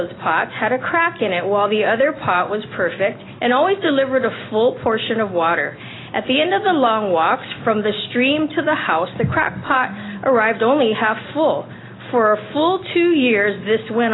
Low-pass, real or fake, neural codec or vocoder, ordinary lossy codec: 7.2 kHz; real; none; AAC, 16 kbps